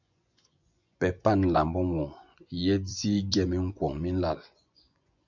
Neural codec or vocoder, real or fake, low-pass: vocoder, 24 kHz, 100 mel bands, Vocos; fake; 7.2 kHz